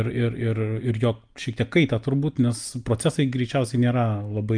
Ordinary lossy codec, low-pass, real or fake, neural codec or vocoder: Opus, 32 kbps; 9.9 kHz; real; none